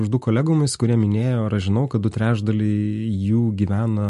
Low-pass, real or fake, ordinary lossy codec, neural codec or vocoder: 14.4 kHz; real; MP3, 48 kbps; none